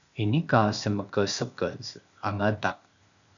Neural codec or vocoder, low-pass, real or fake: codec, 16 kHz, 0.8 kbps, ZipCodec; 7.2 kHz; fake